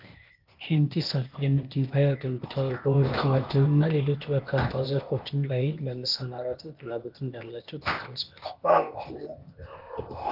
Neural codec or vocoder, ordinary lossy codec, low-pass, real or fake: codec, 16 kHz, 0.8 kbps, ZipCodec; Opus, 24 kbps; 5.4 kHz; fake